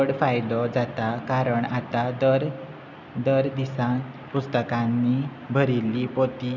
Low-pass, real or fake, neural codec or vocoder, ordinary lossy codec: 7.2 kHz; real; none; none